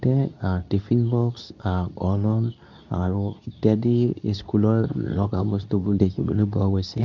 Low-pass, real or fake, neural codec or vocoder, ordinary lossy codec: 7.2 kHz; fake; codec, 24 kHz, 0.9 kbps, WavTokenizer, medium speech release version 2; none